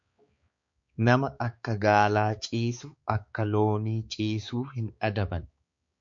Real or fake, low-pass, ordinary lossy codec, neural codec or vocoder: fake; 7.2 kHz; MP3, 48 kbps; codec, 16 kHz, 4 kbps, X-Codec, HuBERT features, trained on balanced general audio